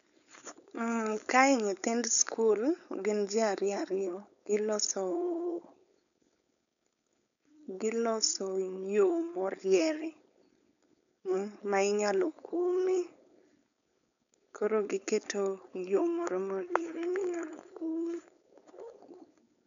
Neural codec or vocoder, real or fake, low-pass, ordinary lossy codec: codec, 16 kHz, 4.8 kbps, FACodec; fake; 7.2 kHz; none